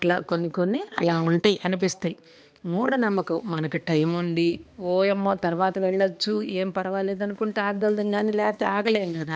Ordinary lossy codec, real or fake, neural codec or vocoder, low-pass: none; fake; codec, 16 kHz, 2 kbps, X-Codec, HuBERT features, trained on balanced general audio; none